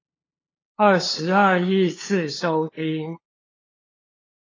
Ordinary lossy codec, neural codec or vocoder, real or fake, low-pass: AAC, 32 kbps; codec, 16 kHz, 2 kbps, FunCodec, trained on LibriTTS, 25 frames a second; fake; 7.2 kHz